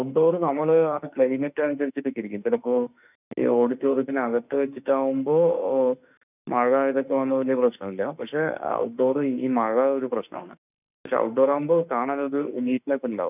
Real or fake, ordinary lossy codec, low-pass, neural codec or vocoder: fake; none; 3.6 kHz; autoencoder, 48 kHz, 32 numbers a frame, DAC-VAE, trained on Japanese speech